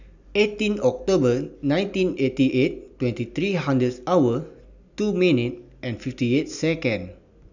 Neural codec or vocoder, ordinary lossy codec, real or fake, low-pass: none; none; real; 7.2 kHz